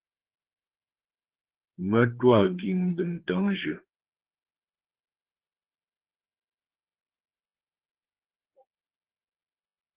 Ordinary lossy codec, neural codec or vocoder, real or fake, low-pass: Opus, 16 kbps; codec, 16 kHz, 4 kbps, FreqCodec, larger model; fake; 3.6 kHz